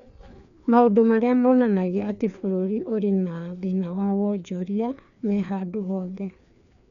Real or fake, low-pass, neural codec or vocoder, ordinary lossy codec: fake; 7.2 kHz; codec, 16 kHz, 2 kbps, FreqCodec, larger model; none